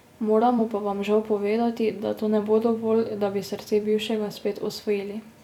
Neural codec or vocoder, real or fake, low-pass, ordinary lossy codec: vocoder, 44.1 kHz, 128 mel bands every 256 samples, BigVGAN v2; fake; 19.8 kHz; none